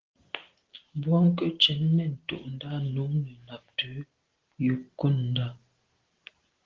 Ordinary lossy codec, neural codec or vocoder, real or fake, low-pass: Opus, 16 kbps; none; real; 7.2 kHz